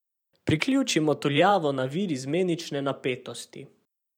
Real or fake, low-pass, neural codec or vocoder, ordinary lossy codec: fake; 19.8 kHz; vocoder, 44.1 kHz, 128 mel bands every 512 samples, BigVGAN v2; none